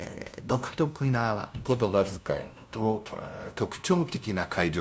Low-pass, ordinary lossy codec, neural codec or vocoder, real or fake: none; none; codec, 16 kHz, 0.5 kbps, FunCodec, trained on LibriTTS, 25 frames a second; fake